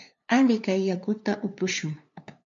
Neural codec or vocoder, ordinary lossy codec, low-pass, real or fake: codec, 16 kHz, 2 kbps, FunCodec, trained on LibriTTS, 25 frames a second; MP3, 64 kbps; 7.2 kHz; fake